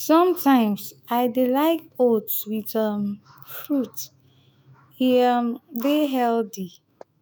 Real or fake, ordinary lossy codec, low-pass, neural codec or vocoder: fake; none; none; autoencoder, 48 kHz, 128 numbers a frame, DAC-VAE, trained on Japanese speech